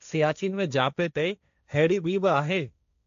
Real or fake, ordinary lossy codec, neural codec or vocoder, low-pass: fake; AAC, 64 kbps; codec, 16 kHz, 1.1 kbps, Voila-Tokenizer; 7.2 kHz